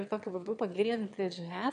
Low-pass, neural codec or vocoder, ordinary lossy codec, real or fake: 9.9 kHz; autoencoder, 22.05 kHz, a latent of 192 numbers a frame, VITS, trained on one speaker; MP3, 64 kbps; fake